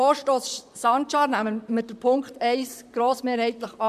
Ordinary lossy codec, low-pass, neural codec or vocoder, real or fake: MP3, 96 kbps; 14.4 kHz; vocoder, 44.1 kHz, 128 mel bands, Pupu-Vocoder; fake